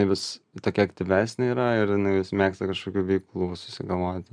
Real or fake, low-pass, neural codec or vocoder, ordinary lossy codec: real; 9.9 kHz; none; MP3, 96 kbps